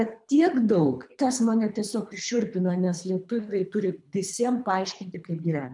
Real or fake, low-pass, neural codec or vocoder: fake; 10.8 kHz; codec, 24 kHz, 3 kbps, HILCodec